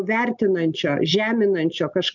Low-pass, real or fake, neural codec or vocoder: 7.2 kHz; real; none